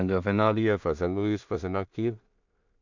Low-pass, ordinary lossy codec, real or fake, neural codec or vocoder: 7.2 kHz; none; fake; codec, 16 kHz in and 24 kHz out, 0.4 kbps, LongCat-Audio-Codec, two codebook decoder